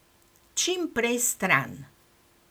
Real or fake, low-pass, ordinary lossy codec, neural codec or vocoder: real; none; none; none